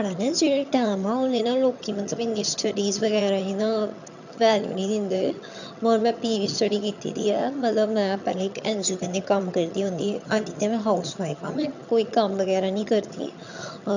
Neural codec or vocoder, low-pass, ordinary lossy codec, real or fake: vocoder, 22.05 kHz, 80 mel bands, HiFi-GAN; 7.2 kHz; none; fake